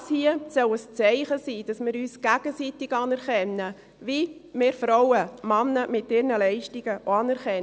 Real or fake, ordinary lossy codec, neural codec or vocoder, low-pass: real; none; none; none